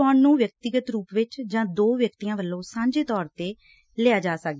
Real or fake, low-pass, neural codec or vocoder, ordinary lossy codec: real; none; none; none